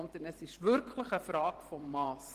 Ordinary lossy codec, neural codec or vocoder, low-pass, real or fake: Opus, 16 kbps; vocoder, 44.1 kHz, 128 mel bands every 512 samples, BigVGAN v2; 14.4 kHz; fake